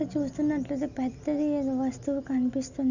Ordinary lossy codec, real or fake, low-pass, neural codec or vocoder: none; real; 7.2 kHz; none